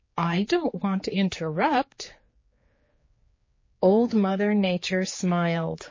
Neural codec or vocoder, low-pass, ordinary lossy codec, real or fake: codec, 16 kHz, 4 kbps, X-Codec, HuBERT features, trained on general audio; 7.2 kHz; MP3, 32 kbps; fake